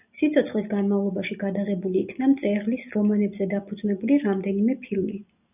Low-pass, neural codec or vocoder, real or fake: 3.6 kHz; none; real